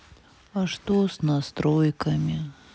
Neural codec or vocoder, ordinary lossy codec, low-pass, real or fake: none; none; none; real